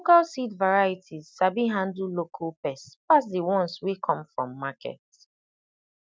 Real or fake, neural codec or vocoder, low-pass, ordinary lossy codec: real; none; none; none